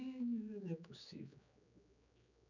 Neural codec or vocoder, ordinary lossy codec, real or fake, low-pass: codec, 16 kHz, 4 kbps, X-Codec, HuBERT features, trained on general audio; none; fake; 7.2 kHz